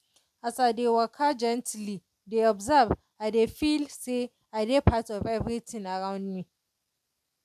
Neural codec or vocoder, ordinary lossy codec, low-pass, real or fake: none; MP3, 96 kbps; 14.4 kHz; real